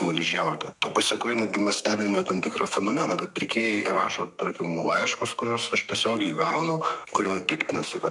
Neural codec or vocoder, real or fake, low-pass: codec, 32 kHz, 1.9 kbps, SNAC; fake; 10.8 kHz